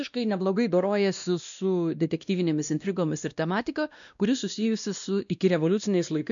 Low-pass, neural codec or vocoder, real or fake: 7.2 kHz; codec, 16 kHz, 1 kbps, X-Codec, WavLM features, trained on Multilingual LibriSpeech; fake